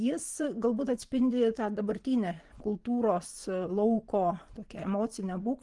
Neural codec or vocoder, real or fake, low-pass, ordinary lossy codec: vocoder, 24 kHz, 100 mel bands, Vocos; fake; 10.8 kHz; Opus, 24 kbps